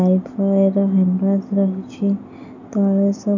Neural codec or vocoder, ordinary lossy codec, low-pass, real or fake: none; none; 7.2 kHz; real